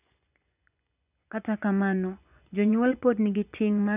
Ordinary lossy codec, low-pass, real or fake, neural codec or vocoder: none; 3.6 kHz; fake; vocoder, 24 kHz, 100 mel bands, Vocos